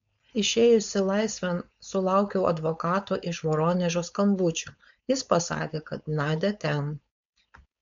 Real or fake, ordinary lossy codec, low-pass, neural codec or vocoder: fake; MP3, 64 kbps; 7.2 kHz; codec, 16 kHz, 4.8 kbps, FACodec